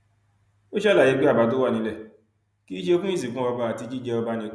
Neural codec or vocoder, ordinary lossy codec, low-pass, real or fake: none; none; none; real